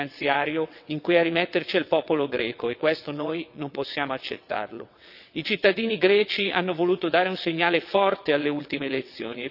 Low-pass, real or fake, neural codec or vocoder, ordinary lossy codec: 5.4 kHz; fake; vocoder, 22.05 kHz, 80 mel bands, WaveNeXt; none